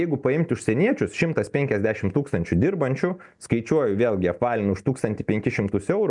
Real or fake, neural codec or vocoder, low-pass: real; none; 10.8 kHz